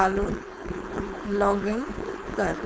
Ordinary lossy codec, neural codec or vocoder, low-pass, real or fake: none; codec, 16 kHz, 4.8 kbps, FACodec; none; fake